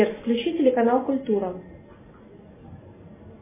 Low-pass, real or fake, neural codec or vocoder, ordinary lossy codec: 3.6 kHz; real; none; MP3, 16 kbps